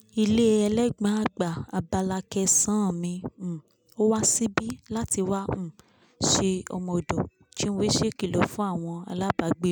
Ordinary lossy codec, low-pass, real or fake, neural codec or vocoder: none; none; real; none